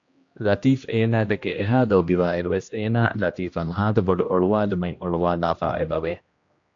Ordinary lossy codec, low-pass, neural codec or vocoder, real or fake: AAC, 48 kbps; 7.2 kHz; codec, 16 kHz, 1 kbps, X-Codec, HuBERT features, trained on general audio; fake